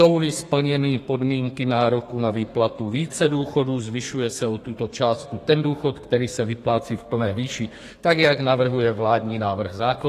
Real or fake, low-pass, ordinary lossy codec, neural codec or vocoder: fake; 14.4 kHz; AAC, 48 kbps; codec, 32 kHz, 1.9 kbps, SNAC